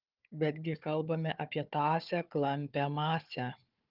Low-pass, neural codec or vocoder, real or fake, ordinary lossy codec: 5.4 kHz; codec, 16 kHz, 4 kbps, FunCodec, trained on Chinese and English, 50 frames a second; fake; Opus, 24 kbps